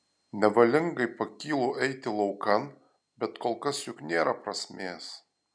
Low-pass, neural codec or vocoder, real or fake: 9.9 kHz; none; real